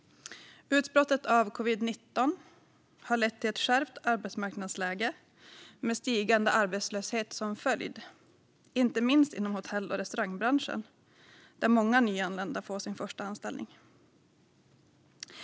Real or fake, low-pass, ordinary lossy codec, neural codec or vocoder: real; none; none; none